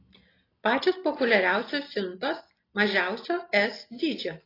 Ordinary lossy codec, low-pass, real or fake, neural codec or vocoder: AAC, 24 kbps; 5.4 kHz; real; none